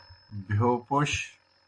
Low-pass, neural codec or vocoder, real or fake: 9.9 kHz; none; real